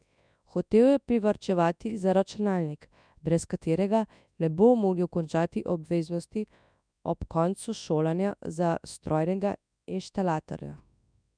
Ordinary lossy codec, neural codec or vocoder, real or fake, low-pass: none; codec, 24 kHz, 0.9 kbps, WavTokenizer, large speech release; fake; 9.9 kHz